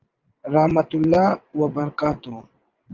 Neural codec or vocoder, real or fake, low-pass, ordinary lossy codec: vocoder, 22.05 kHz, 80 mel bands, WaveNeXt; fake; 7.2 kHz; Opus, 32 kbps